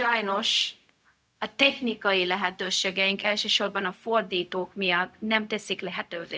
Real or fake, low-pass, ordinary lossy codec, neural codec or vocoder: fake; none; none; codec, 16 kHz, 0.4 kbps, LongCat-Audio-Codec